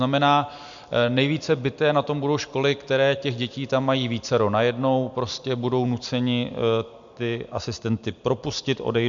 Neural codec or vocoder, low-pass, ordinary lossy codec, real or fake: none; 7.2 kHz; MP3, 64 kbps; real